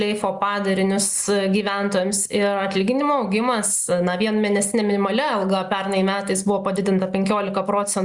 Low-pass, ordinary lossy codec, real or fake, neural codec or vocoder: 10.8 kHz; Opus, 64 kbps; real; none